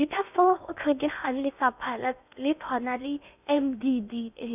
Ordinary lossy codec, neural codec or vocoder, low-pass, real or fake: none; codec, 16 kHz in and 24 kHz out, 0.8 kbps, FocalCodec, streaming, 65536 codes; 3.6 kHz; fake